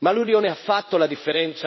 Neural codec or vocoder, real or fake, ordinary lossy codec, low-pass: codec, 16 kHz in and 24 kHz out, 1 kbps, XY-Tokenizer; fake; MP3, 24 kbps; 7.2 kHz